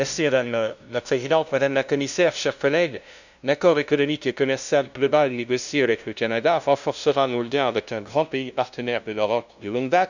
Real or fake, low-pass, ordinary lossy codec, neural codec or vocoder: fake; 7.2 kHz; none; codec, 16 kHz, 0.5 kbps, FunCodec, trained on LibriTTS, 25 frames a second